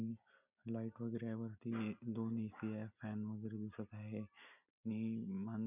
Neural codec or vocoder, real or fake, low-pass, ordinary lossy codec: none; real; 3.6 kHz; none